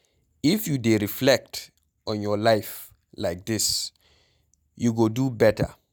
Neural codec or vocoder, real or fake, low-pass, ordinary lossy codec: none; real; none; none